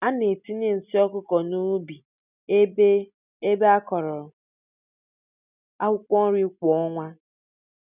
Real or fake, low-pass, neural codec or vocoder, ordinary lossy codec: real; 3.6 kHz; none; none